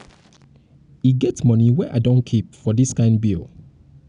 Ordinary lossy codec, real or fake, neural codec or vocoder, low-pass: none; real; none; 9.9 kHz